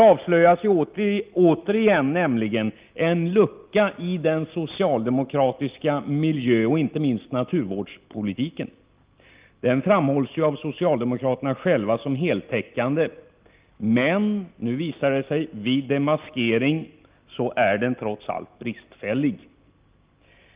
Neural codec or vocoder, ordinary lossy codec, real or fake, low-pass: none; Opus, 32 kbps; real; 3.6 kHz